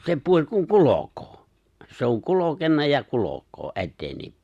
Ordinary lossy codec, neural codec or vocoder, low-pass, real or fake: Opus, 64 kbps; none; 14.4 kHz; real